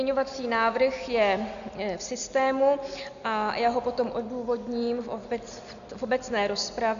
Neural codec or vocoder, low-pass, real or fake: none; 7.2 kHz; real